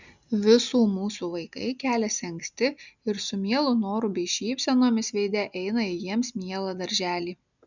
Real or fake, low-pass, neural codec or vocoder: real; 7.2 kHz; none